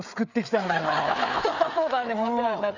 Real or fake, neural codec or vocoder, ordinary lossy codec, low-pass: fake; codec, 16 kHz, 4 kbps, FreqCodec, larger model; none; 7.2 kHz